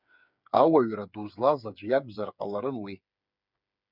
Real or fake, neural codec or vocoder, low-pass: fake; codec, 16 kHz, 8 kbps, FreqCodec, smaller model; 5.4 kHz